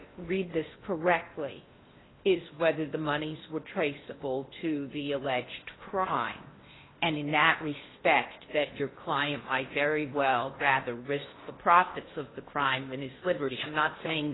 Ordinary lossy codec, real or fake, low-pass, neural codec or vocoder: AAC, 16 kbps; fake; 7.2 kHz; codec, 16 kHz in and 24 kHz out, 0.8 kbps, FocalCodec, streaming, 65536 codes